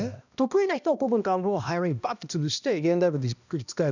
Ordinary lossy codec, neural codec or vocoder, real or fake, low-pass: none; codec, 16 kHz, 1 kbps, X-Codec, HuBERT features, trained on balanced general audio; fake; 7.2 kHz